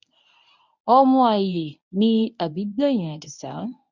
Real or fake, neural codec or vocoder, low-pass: fake; codec, 24 kHz, 0.9 kbps, WavTokenizer, medium speech release version 1; 7.2 kHz